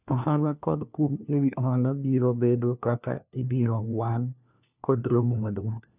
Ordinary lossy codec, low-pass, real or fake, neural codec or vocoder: none; 3.6 kHz; fake; codec, 16 kHz, 1 kbps, FunCodec, trained on LibriTTS, 50 frames a second